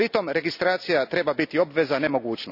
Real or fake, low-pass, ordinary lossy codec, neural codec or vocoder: real; 5.4 kHz; none; none